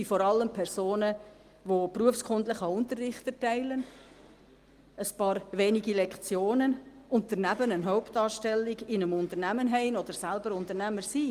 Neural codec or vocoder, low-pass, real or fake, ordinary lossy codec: none; 14.4 kHz; real; Opus, 24 kbps